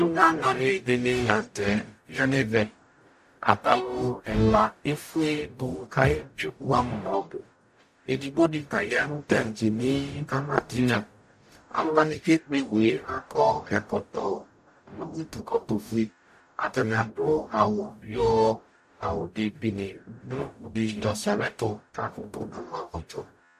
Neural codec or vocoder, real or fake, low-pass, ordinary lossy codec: codec, 44.1 kHz, 0.9 kbps, DAC; fake; 14.4 kHz; AAC, 96 kbps